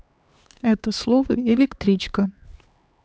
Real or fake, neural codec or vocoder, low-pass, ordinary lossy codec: fake; codec, 16 kHz, 2 kbps, X-Codec, HuBERT features, trained on LibriSpeech; none; none